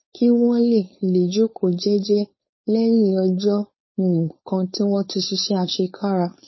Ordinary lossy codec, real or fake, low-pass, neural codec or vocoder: MP3, 24 kbps; fake; 7.2 kHz; codec, 16 kHz, 4.8 kbps, FACodec